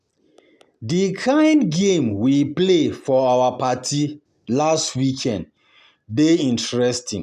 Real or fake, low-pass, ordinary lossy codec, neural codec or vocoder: real; 14.4 kHz; none; none